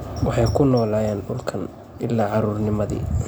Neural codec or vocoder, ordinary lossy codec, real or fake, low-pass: none; none; real; none